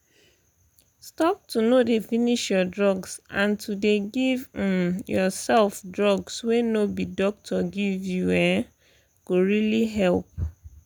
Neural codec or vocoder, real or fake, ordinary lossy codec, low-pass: none; real; none; none